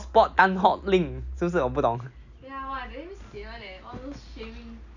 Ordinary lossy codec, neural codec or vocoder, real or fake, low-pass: none; none; real; 7.2 kHz